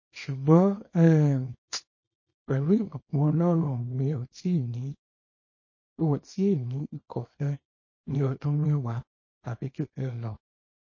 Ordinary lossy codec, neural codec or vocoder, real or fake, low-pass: MP3, 32 kbps; codec, 24 kHz, 0.9 kbps, WavTokenizer, small release; fake; 7.2 kHz